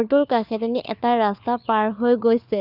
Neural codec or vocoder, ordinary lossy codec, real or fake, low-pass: codec, 16 kHz, 4 kbps, FunCodec, trained on LibriTTS, 50 frames a second; none; fake; 5.4 kHz